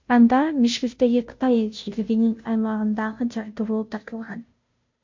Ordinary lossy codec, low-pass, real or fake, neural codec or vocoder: MP3, 48 kbps; 7.2 kHz; fake; codec, 16 kHz, 0.5 kbps, FunCodec, trained on Chinese and English, 25 frames a second